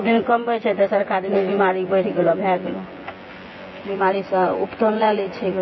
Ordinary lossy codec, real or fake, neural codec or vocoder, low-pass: MP3, 24 kbps; fake; vocoder, 24 kHz, 100 mel bands, Vocos; 7.2 kHz